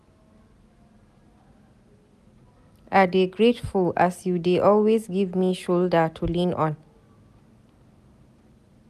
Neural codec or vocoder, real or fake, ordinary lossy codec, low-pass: none; real; none; 14.4 kHz